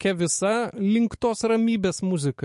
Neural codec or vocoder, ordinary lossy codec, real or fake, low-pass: autoencoder, 48 kHz, 128 numbers a frame, DAC-VAE, trained on Japanese speech; MP3, 48 kbps; fake; 14.4 kHz